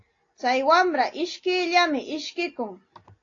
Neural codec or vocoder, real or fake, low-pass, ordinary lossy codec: none; real; 7.2 kHz; AAC, 32 kbps